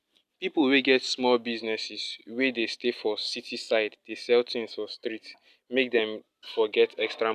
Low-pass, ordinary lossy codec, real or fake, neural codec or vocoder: 14.4 kHz; none; real; none